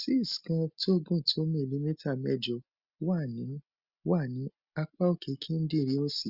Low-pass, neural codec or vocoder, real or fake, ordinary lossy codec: 5.4 kHz; none; real; Opus, 64 kbps